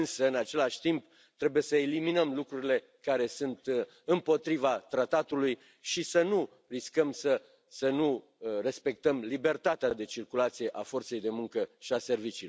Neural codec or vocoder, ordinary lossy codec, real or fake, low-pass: none; none; real; none